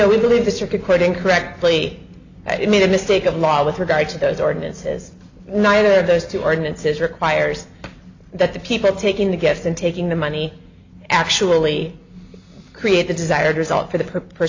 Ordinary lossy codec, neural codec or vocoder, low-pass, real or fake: AAC, 48 kbps; none; 7.2 kHz; real